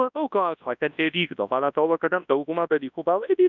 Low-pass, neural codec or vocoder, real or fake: 7.2 kHz; codec, 24 kHz, 0.9 kbps, WavTokenizer, large speech release; fake